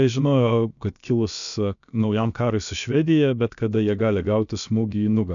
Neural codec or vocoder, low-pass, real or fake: codec, 16 kHz, about 1 kbps, DyCAST, with the encoder's durations; 7.2 kHz; fake